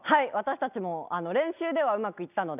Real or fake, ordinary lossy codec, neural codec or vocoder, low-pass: real; none; none; 3.6 kHz